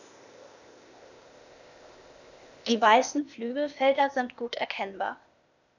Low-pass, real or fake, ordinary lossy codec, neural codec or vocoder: 7.2 kHz; fake; none; codec, 16 kHz, 0.8 kbps, ZipCodec